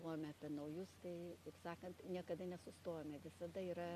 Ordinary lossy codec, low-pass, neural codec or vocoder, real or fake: AAC, 64 kbps; 14.4 kHz; none; real